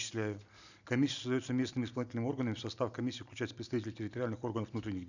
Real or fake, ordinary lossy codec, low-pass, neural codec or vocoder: real; none; 7.2 kHz; none